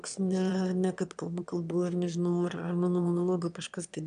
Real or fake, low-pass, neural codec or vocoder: fake; 9.9 kHz; autoencoder, 22.05 kHz, a latent of 192 numbers a frame, VITS, trained on one speaker